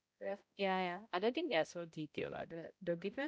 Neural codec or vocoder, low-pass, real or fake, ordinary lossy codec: codec, 16 kHz, 0.5 kbps, X-Codec, HuBERT features, trained on balanced general audio; none; fake; none